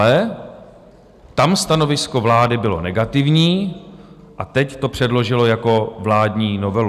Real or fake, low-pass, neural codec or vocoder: real; 14.4 kHz; none